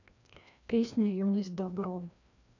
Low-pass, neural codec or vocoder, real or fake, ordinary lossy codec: 7.2 kHz; codec, 16 kHz, 1 kbps, FreqCodec, larger model; fake; none